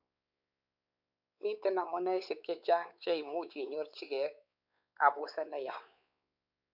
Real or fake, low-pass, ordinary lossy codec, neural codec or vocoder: fake; 5.4 kHz; none; codec, 16 kHz, 4 kbps, X-Codec, WavLM features, trained on Multilingual LibriSpeech